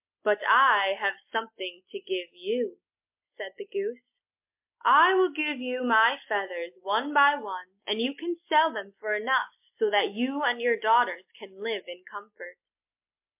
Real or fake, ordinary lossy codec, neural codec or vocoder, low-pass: real; MP3, 32 kbps; none; 3.6 kHz